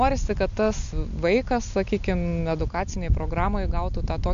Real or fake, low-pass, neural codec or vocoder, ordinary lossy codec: real; 7.2 kHz; none; MP3, 64 kbps